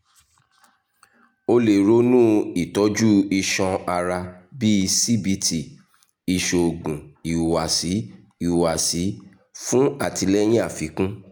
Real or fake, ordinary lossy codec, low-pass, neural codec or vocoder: real; none; none; none